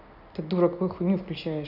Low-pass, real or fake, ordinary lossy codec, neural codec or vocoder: 5.4 kHz; real; none; none